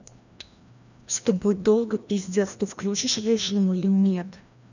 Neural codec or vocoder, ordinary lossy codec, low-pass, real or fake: codec, 16 kHz, 1 kbps, FreqCodec, larger model; none; 7.2 kHz; fake